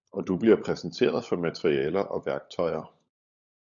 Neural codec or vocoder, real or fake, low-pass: codec, 16 kHz, 16 kbps, FunCodec, trained on LibriTTS, 50 frames a second; fake; 7.2 kHz